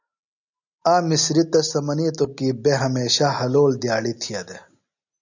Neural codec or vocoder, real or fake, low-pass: none; real; 7.2 kHz